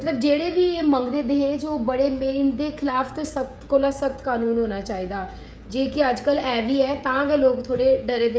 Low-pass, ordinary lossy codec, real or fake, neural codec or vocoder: none; none; fake; codec, 16 kHz, 16 kbps, FreqCodec, smaller model